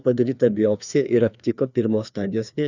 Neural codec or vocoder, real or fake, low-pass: codec, 16 kHz, 2 kbps, FreqCodec, larger model; fake; 7.2 kHz